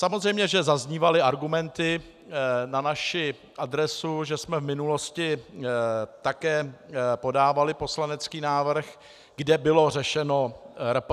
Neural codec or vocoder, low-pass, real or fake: none; 14.4 kHz; real